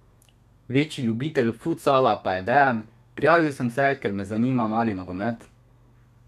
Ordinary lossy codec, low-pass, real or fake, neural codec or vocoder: none; 14.4 kHz; fake; codec, 32 kHz, 1.9 kbps, SNAC